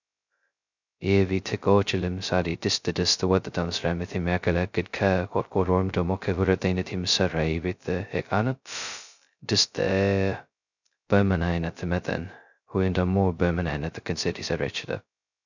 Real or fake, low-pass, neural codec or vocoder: fake; 7.2 kHz; codec, 16 kHz, 0.2 kbps, FocalCodec